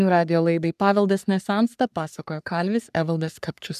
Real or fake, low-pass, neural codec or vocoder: fake; 14.4 kHz; codec, 44.1 kHz, 3.4 kbps, Pupu-Codec